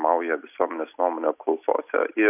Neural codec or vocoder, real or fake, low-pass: none; real; 3.6 kHz